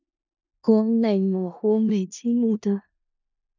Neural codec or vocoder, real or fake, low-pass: codec, 16 kHz in and 24 kHz out, 0.4 kbps, LongCat-Audio-Codec, four codebook decoder; fake; 7.2 kHz